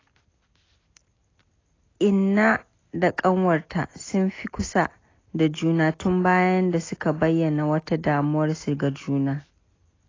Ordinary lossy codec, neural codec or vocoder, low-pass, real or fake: AAC, 32 kbps; none; 7.2 kHz; real